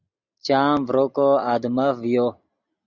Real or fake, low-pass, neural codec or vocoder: real; 7.2 kHz; none